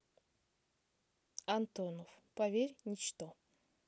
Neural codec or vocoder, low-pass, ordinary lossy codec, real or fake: none; none; none; real